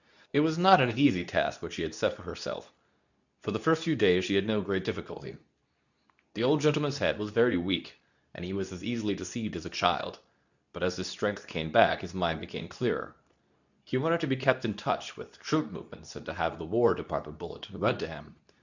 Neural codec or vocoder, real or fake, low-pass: codec, 24 kHz, 0.9 kbps, WavTokenizer, medium speech release version 2; fake; 7.2 kHz